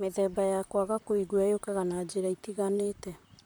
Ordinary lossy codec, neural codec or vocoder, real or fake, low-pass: none; vocoder, 44.1 kHz, 128 mel bands, Pupu-Vocoder; fake; none